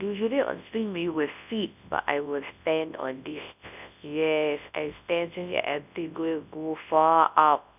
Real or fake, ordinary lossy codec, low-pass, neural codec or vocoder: fake; none; 3.6 kHz; codec, 24 kHz, 0.9 kbps, WavTokenizer, large speech release